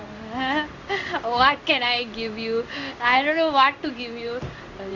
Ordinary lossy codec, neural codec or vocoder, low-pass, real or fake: none; codec, 16 kHz in and 24 kHz out, 1 kbps, XY-Tokenizer; 7.2 kHz; fake